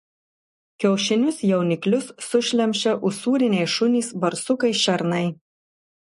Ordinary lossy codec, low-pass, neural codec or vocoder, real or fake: MP3, 48 kbps; 14.4 kHz; none; real